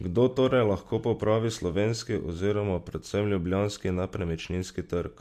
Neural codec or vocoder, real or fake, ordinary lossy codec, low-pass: none; real; MP3, 64 kbps; 14.4 kHz